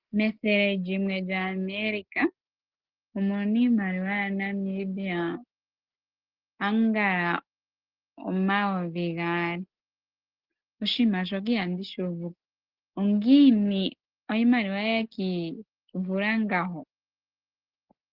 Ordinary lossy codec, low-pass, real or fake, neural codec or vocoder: Opus, 16 kbps; 5.4 kHz; real; none